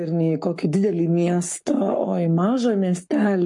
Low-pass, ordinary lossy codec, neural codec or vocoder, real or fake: 10.8 kHz; MP3, 48 kbps; codec, 44.1 kHz, 7.8 kbps, Pupu-Codec; fake